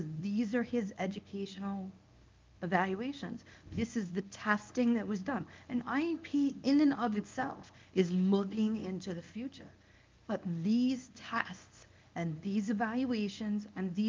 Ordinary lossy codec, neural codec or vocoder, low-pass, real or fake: Opus, 32 kbps; codec, 24 kHz, 0.9 kbps, WavTokenizer, medium speech release version 1; 7.2 kHz; fake